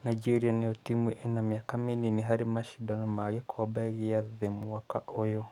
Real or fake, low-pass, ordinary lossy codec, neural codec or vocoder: fake; 19.8 kHz; none; codec, 44.1 kHz, 7.8 kbps, DAC